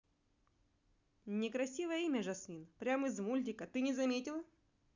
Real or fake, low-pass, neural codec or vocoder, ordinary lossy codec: real; 7.2 kHz; none; none